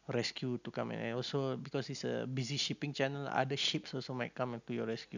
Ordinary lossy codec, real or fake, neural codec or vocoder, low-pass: none; real; none; 7.2 kHz